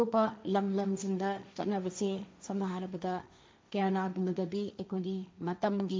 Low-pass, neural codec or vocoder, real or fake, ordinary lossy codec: none; codec, 16 kHz, 1.1 kbps, Voila-Tokenizer; fake; none